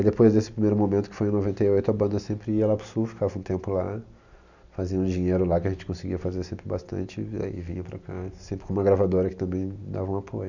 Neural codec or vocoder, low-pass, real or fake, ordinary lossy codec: none; 7.2 kHz; real; none